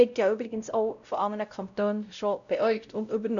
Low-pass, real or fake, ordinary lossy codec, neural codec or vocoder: 7.2 kHz; fake; none; codec, 16 kHz, 0.5 kbps, X-Codec, WavLM features, trained on Multilingual LibriSpeech